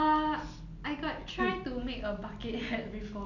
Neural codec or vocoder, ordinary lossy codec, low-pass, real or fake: none; none; 7.2 kHz; real